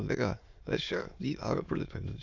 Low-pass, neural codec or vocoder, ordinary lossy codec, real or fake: 7.2 kHz; autoencoder, 22.05 kHz, a latent of 192 numbers a frame, VITS, trained on many speakers; AAC, 48 kbps; fake